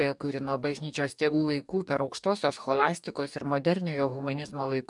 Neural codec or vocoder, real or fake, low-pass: codec, 44.1 kHz, 2.6 kbps, DAC; fake; 10.8 kHz